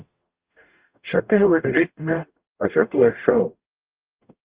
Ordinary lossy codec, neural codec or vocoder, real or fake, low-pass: Opus, 24 kbps; codec, 44.1 kHz, 0.9 kbps, DAC; fake; 3.6 kHz